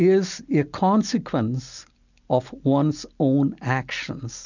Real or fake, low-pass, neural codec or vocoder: real; 7.2 kHz; none